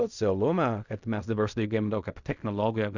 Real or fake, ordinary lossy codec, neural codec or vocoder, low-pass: fake; Opus, 64 kbps; codec, 16 kHz in and 24 kHz out, 0.4 kbps, LongCat-Audio-Codec, fine tuned four codebook decoder; 7.2 kHz